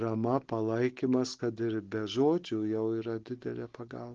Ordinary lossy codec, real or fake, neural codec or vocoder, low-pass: Opus, 16 kbps; real; none; 7.2 kHz